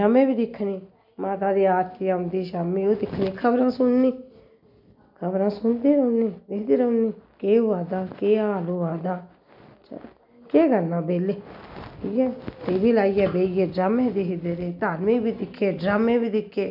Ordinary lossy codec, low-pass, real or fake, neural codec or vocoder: none; 5.4 kHz; real; none